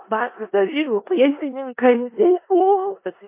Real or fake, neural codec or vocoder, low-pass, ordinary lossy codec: fake; codec, 16 kHz in and 24 kHz out, 0.4 kbps, LongCat-Audio-Codec, four codebook decoder; 3.6 kHz; MP3, 24 kbps